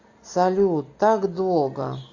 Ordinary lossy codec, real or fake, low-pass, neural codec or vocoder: AAC, 48 kbps; real; 7.2 kHz; none